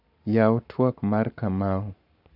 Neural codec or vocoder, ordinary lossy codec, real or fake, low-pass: codec, 44.1 kHz, 7.8 kbps, Pupu-Codec; none; fake; 5.4 kHz